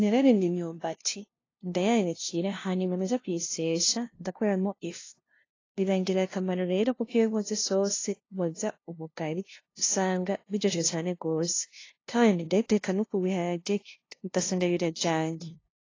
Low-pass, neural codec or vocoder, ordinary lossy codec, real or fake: 7.2 kHz; codec, 16 kHz, 0.5 kbps, FunCodec, trained on LibriTTS, 25 frames a second; AAC, 32 kbps; fake